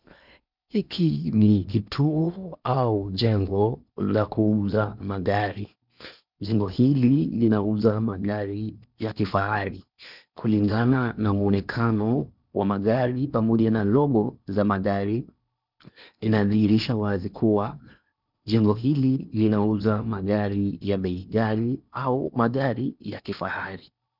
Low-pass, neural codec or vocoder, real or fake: 5.4 kHz; codec, 16 kHz in and 24 kHz out, 0.8 kbps, FocalCodec, streaming, 65536 codes; fake